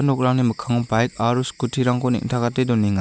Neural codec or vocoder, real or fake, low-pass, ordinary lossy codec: none; real; none; none